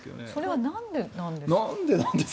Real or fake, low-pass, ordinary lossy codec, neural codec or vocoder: real; none; none; none